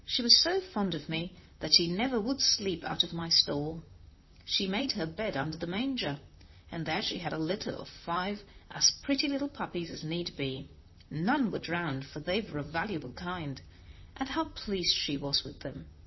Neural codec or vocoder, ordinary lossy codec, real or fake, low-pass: vocoder, 44.1 kHz, 128 mel bands, Pupu-Vocoder; MP3, 24 kbps; fake; 7.2 kHz